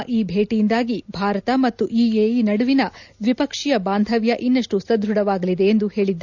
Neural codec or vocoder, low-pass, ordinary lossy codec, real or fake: none; 7.2 kHz; none; real